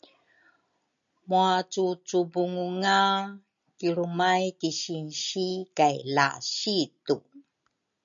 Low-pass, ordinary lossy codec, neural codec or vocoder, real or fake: 7.2 kHz; MP3, 96 kbps; none; real